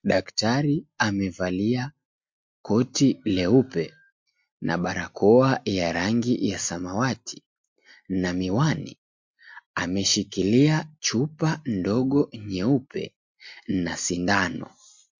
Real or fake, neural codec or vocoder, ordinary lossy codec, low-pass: real; none; MP3, 48 kbps; 7.2 kHz